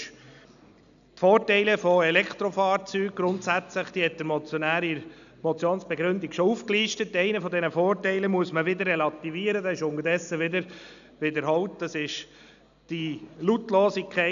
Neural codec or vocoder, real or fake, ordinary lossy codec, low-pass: none; real; none; 7.2 kHz